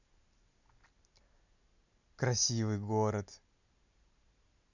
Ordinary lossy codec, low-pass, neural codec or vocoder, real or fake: none; 7.2 kHz; none; real